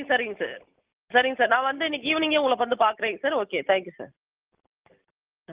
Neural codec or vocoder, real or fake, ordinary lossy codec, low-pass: none; real; Opus, 32 kbps; 3.6 kHz